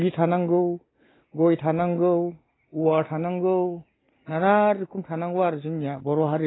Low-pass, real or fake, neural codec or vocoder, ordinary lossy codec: 7.2 kHz; real; none; AAC, 16 kbps